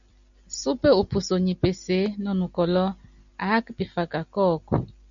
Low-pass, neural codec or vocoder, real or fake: 7.2 kHz; none; real